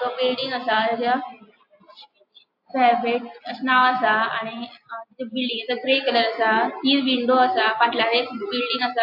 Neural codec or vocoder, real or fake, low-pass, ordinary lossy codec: none; real; 5.4 kHz; AAC, 48 kbps